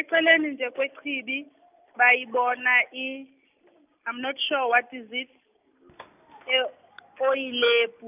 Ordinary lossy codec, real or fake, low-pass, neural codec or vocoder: none; real; 3.6 kHz; none